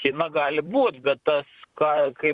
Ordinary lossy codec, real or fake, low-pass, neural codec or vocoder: Opus, 64 kbps; fake; 10.8 kHz; vocoder, 44.1 kHz, 128 mel bands, Pupu-Vocoder